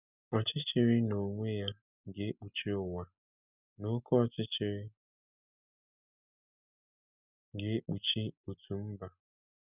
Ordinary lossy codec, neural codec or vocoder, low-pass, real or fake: none; none; 3.6 kHz; real